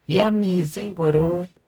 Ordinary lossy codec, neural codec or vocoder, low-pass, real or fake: none; codec, 44.1 kHz, 0.9 kbps, DAC; none; fake